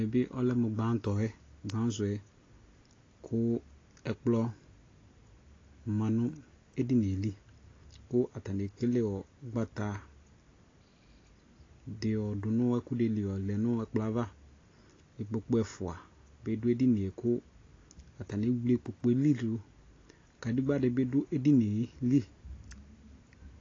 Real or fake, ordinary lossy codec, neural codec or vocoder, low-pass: real; AAC, 32 kbps; none; 7.2 kHz